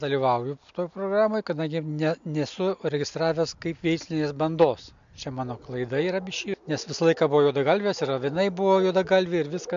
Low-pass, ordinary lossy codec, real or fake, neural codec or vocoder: 7.2 kHz; MP3, 64 kbps; real; none